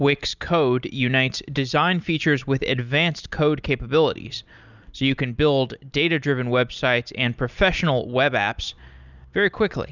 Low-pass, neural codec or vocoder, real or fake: 7.2 kHz; none; real